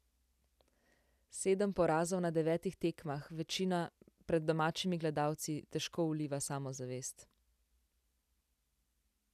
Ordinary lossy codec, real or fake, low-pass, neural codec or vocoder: none; real; 14.4 kHz; none